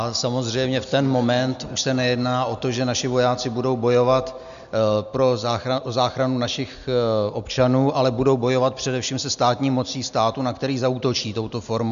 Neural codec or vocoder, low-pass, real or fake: none; 7.2 kHz; real